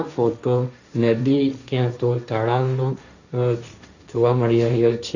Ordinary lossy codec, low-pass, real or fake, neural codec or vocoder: none; 7.2 kHz; fake; codec, 16 kHz, 1.1 kbps, Voila-Tokenizer